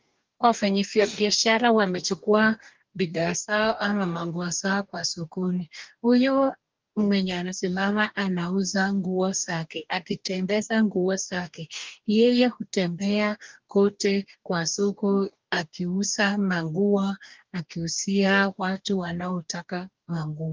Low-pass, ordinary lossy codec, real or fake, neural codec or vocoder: 7.2 kHz; Opus, 32 kbps; fake; codec, 44.1 kHz, 2.6 kbps, DAC